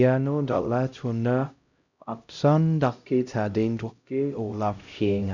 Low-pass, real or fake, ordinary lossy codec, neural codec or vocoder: 7.2 kHz; fake; none; codec, 16 kHz, 0.5 kbps, X-Codec, HuBERT features, trained on LibriSpeech